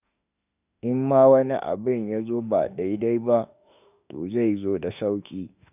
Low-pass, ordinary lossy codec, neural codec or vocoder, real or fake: 3.6 kHz; none; autoencoder, 48 kHz, 32 numbers a frame, DAC-VAE, trained on Japanese speech; fake